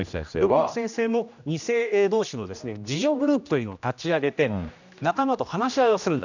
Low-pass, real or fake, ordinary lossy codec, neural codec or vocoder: 7.2 kHz; fake; none; codec, 16 kHz, 1 kbps, X-Codec, HuBERT features, trained on general audio